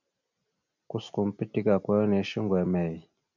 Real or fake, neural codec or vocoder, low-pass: real; none; 7.2 kHz